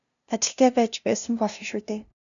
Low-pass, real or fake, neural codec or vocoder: 7.2 kHz; fake; codec, 16 kHz, 0.5 kbps, FunCodec, trained on LibriTTS, 25 frames a second